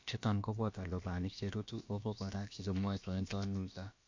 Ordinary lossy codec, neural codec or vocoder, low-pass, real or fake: MP3, 48 kbps; codec, 16 kHz, about 1 kbps, DyCAST, with the encoder's durations; 7.2 kHz; fake